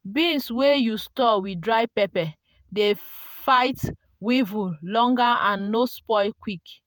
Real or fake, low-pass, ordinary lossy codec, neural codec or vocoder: fake; none; none; vocoder, 48 kHz, 128 mel bands, Vocos